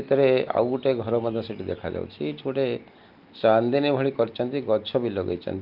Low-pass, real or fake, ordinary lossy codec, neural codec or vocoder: 5.4 kHz; real; Opus, 32 kbps; none